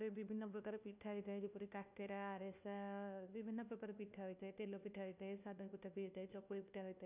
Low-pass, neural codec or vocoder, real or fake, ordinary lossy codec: 3.6 kHz; codec, 16 kHz, 1 kbps, FunCodec, trained on LibriTTS, 50 frames a second; fake; none